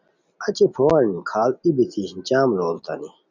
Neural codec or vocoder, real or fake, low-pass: none; real; 7.2 kHz